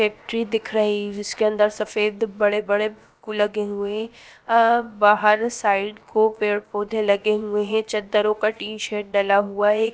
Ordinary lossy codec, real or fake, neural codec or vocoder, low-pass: none; fake; codec, 16 kHz, about 1 kbps, DyCAST, with the encoder's durations; none